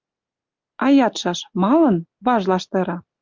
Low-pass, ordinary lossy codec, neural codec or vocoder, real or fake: 7.2 kHz; Opus, 32 kbps; none; real